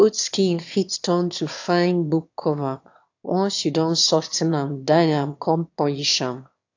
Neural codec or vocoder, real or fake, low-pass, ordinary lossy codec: autoencoder, 22.05 kHz, a latent of 192 numbers a frame, VITS, trained on one speaker; fake; 7.2 kHz; AAC, 48 kbps